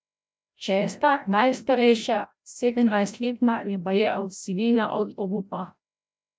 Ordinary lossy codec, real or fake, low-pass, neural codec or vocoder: none; fake; none; codec, 16 kHz, 0.5 kbps, FreqCodec, larger model